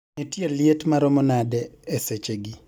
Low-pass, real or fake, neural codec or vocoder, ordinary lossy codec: 19.8 kHz; real; none; none